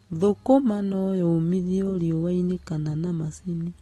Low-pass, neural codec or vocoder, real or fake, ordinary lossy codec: 19.8 kHz; none; real; AAC, 32 kbps